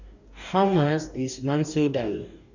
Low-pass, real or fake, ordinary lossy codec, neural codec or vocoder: 7.2 kHz; fake; none; codec, 44.1 kHz, 2.6 kbps, DAC